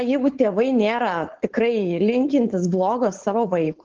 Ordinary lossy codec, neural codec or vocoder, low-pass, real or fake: Opus, 16 kbps; codec, 16 kHz, 4.8 kbps, FACodec; 7.2 kHz; fake